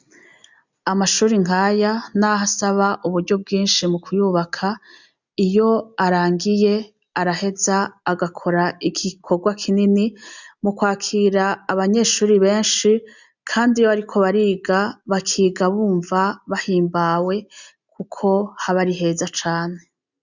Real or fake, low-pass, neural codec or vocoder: real; 7.2 kHz; none